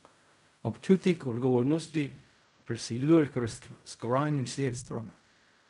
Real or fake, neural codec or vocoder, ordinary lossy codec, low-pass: fake; codec, 16 kHz in and 24 kHz out, 0.4 kbps, LongCat-Audio-Codec, fine tuned four codebook decoder; none; 10.8 kHz